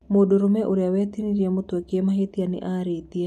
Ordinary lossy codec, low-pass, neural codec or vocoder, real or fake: none; 14.4 kHz; none; real